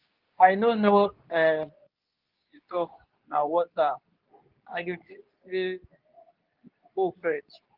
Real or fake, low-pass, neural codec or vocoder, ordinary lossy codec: fake; 5.4 kHz; codec, 24 kHz, 0.9 kbps, WavTokenizer, medium speech release version 2; Opus, 24 kbps